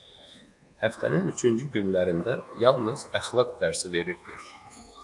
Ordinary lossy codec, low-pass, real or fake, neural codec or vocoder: AAC, 64 kbps; 10.8 kHz; fake; codec, 24 kHz, 1.2 kbps, DualCodec